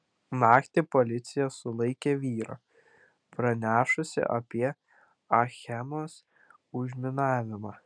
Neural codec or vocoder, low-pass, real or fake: none; 9.9 kHz; real